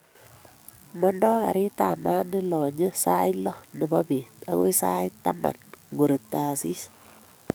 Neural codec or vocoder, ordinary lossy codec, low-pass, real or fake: codec, 44.1 kHz, 7.8 kbps, DAC; none; none; fake